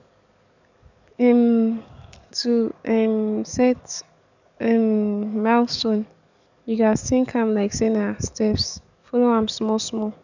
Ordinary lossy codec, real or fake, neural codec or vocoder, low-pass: none; fake; codec, 44.1 kHz, 7.8 kbps, DAC; 7.2 kHz